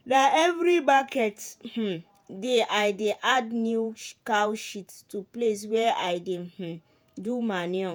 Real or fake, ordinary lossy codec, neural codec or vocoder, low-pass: fake; none; vocoder, 48 kHz, 128 mel bands, Vocos; none